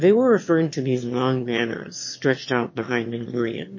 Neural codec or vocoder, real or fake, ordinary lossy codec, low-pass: autoencoder, 22.05 kHz, a latent of 192 numbers a frame, VITS, trained on one speaker; fake; MP3, 32 kbps; 7.2 kHz